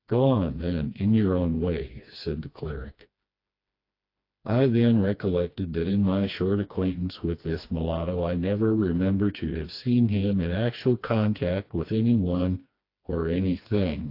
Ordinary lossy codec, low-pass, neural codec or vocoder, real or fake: AAC, 32 kbps; 5.4 kHz; codec, 16 kHz, 2 kbps, FreqCodec, smaller model; fake